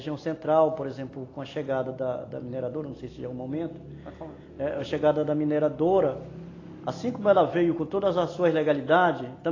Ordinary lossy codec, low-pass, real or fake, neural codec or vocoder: AAC, 32 kbps; 7.2 kHz; real; none